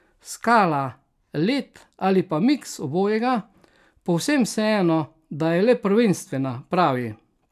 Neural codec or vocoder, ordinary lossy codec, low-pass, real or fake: none; none; 14.4 kHz; real